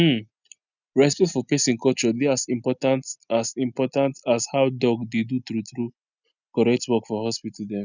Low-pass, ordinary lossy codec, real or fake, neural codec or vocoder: 7.2 kHz; none; real; none